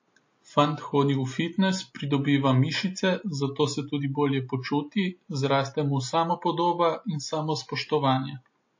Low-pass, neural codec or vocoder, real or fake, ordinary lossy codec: 7.2 kHz; none; real; MP3, 32 kbps